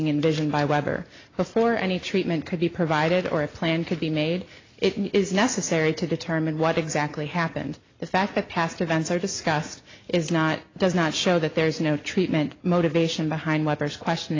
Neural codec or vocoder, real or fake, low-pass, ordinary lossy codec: none; real; 7.2 kHz; AAC, 32 kbps